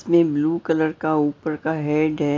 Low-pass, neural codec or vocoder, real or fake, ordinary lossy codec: 7.2 kHz; none; real; AAC, 48 kbps